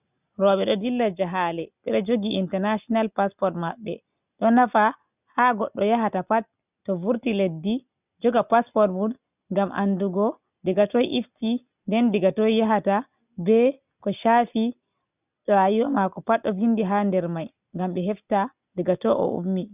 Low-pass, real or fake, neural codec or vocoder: 3.6 kHz; real; none